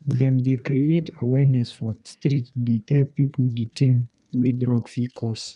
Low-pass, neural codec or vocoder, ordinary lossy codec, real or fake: 10.8 kHz; codec, 24 kHz, 1 kbps, SNAC; none; fake